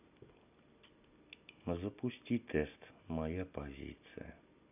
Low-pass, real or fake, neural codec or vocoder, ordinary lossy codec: 3.6 kHz; real; none; none